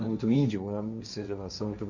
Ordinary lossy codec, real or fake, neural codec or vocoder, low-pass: none; fake; codec, 16 kHz, 1.1 kbps, Voila-Tokenizer; 7.2 kHz